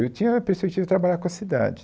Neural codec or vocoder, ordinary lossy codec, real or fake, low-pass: none; none; real; none